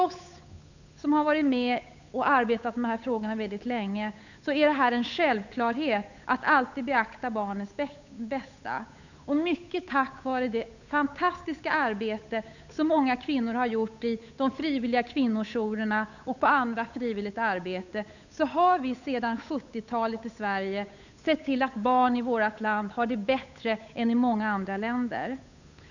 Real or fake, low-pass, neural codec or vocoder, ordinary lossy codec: fake; 7.2 kHz; codec, 16 kHz, 8 kbps, FunCodec, trained on Chinese and English, 25 frames a second; none